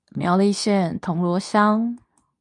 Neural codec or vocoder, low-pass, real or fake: codec, 24 kHz, 0.9 kbps, WavTokenizer, medium speech release version 2; 10.8 kHz; fake